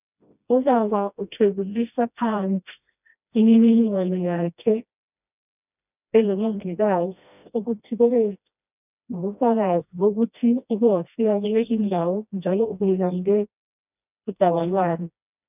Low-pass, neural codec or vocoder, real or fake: 3.6 kHz; codec, 16 kHz, 1 kbps, FreqCodec, smaller model; fake